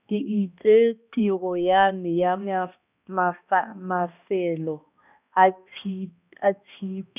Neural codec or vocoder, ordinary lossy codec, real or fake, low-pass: codec, 16 kHz, 1 kbps, X-Codec, HuBERT features, trained on balanced general audio; none; fake; 3.6 kHz